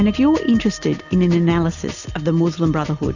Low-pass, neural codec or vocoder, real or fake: 7.2 kHz; none; real